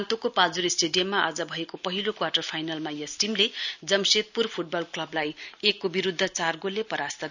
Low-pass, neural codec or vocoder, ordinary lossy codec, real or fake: 7.2 kHz; none; none; real